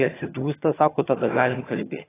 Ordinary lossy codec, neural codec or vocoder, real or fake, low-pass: AAC, 16 kbps; vocoder, 22.05 kHz, 80 mel bands, HiFi-GAN; fake; 3.6 kHz